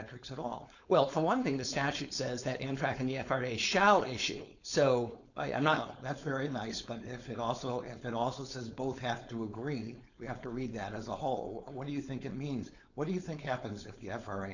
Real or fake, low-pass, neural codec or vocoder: fake; 7.2 kHz; codec, 16 kHz, 4.8 kbps, FACodec